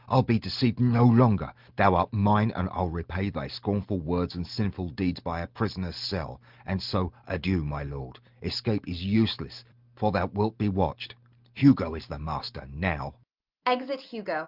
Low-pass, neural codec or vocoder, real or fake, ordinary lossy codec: 5.4 kHz; none; real; Opus, 32 kbps